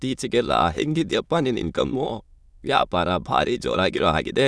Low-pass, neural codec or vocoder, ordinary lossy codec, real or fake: none; autoencoder, 22.05 kHz, a latent of 192 numbers a frame, VITS, trained on many speakers; none; fake